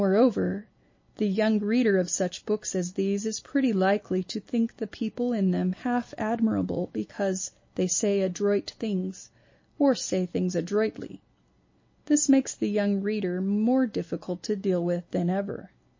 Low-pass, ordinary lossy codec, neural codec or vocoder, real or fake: 7.2 kHz; MP3, 32 kbps; none; real